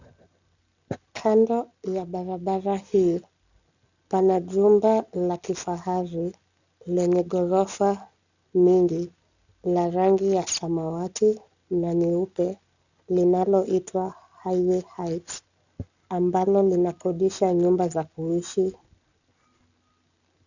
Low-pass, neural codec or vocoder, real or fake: 7.2 kHz; none; real